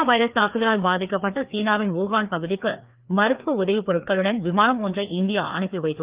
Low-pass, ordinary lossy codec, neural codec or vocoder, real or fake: 3.6 kHz; Opus, 32 kbps; codec, 16 kHz, 2 kbps, FreqCodec, larger model; fake